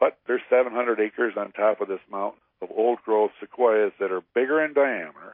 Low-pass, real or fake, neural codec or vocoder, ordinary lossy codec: 5.4 kHz; real; none; MP3, 24 kbps